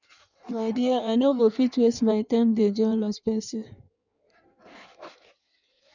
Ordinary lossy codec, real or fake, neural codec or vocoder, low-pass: none; fake; codec, 16 kHz in and 24 kHz out, 1.1 kbps, FireRedTTS-2 codec; 7.2 kHz